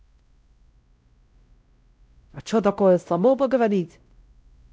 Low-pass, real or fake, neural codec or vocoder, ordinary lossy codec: none; fake; codec, 16 kHz, 0.5 kbps, X-Codec, WavLM features, trained on Multilingual LibriSpeech; none